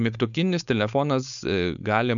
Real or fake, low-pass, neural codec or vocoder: fake; 7.2 kHz; codec, 16 kHz, 4.8 kbps, FACodec